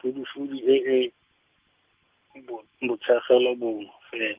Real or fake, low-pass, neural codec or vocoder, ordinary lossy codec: real; 3.6 kHz; none; Opus, 32 kbps